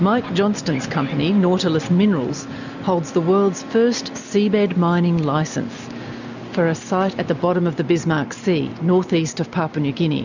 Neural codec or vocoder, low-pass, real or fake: none; 7.2 kHz; real